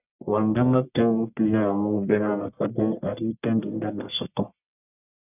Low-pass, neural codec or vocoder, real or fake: 3.6 kHz; codec, 44.1 kHz, 1.7 kbps, Pupu-Codec; fake